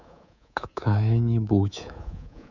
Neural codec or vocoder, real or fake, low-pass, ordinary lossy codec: codec, 24 kHz, 3.1 kbps, DualCodec; fake; 7.2 kHz; none